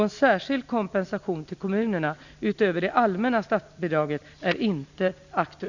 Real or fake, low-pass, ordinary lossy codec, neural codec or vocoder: real; 7.2 kHz; none; none